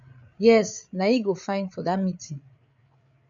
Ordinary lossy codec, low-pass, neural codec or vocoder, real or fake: AAC, 64 kbps; 7.2 kHz; codec, 16 kHz, 8 kbps, FreqCodec, larger model; fake